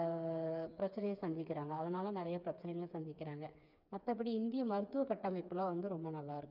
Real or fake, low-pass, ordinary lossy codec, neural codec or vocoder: fake; 5.4 kHz; none; codec, 16 kHz, 4 kbps, FreqCodec, smaller model